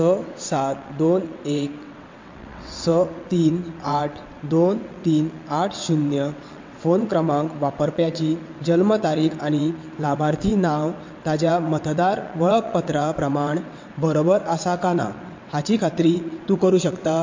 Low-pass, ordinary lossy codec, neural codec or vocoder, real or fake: 7.2 kHz; MP3, 48 kbps; vocoder, 22.05 kHz, 80 mel bands, WaveNeXt; fake